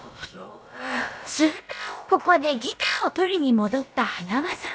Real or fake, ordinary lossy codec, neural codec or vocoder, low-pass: fake; none; codec, 16 kHz, about 1 kbps, DyCAST, with the encoder's durations; none